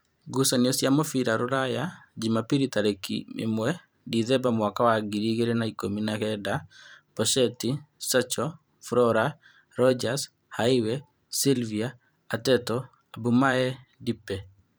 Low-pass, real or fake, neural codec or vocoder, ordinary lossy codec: none; fake; vocoder, 44.1 kHz, 128 mel bands every 512 samples, BigVGAN v2; none